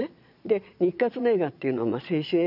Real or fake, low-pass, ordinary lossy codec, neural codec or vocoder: fake; 5.4 kHz; none; vocoder, 22.05 kHz, 80 mel bands, Vocos